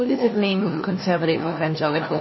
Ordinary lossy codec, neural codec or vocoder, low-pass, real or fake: MP3, 24 kbps; codec, 16 kHz, 1 kbps, FunCodec, trained on LibriTTS, 50 frames a second; 7.2 kHz; fake